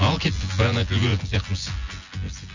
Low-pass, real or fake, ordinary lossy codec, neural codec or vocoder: 7.2 kHz; fake; Opus, 64 kbps; vocoder, 24 kHz, 100 mel bands, Vocos